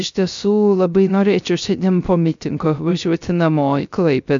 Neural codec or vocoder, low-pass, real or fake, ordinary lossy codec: codec, 16 kHz, 0.3 kbps, FocalCodec; 7.2 kHz; fake; MP3, 64 kbps